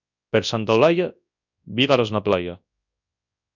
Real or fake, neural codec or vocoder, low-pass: fake; codec, 24 kHz, 0.9 kbps, WavTokenizer, large speech release; 7.2 kHz